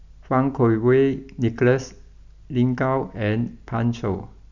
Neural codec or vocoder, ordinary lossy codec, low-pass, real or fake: none; none; 7.2 kHz; real